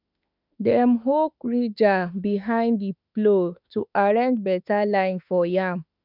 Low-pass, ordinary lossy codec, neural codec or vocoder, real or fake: 5.4 kHz; none; autoencoder, 48 kHz, 32 numbers a frame, DAC-VAE, trained on Japanese speech; fake